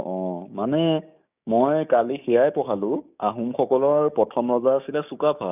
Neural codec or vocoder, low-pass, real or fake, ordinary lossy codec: codec, 16 kHz, 6 kbps, DAC; 3.6 kHz; fake; none